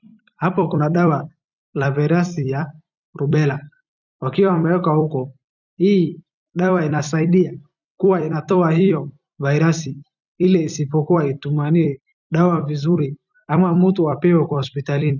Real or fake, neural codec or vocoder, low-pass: fake; vocoder, 44.1 kHz, 128 mel bands every 256 samples, BigVGAN v2; 7.2 kHz